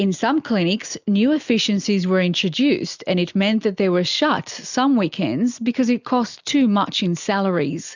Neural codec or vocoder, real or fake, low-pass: none; real; 7.2 kHz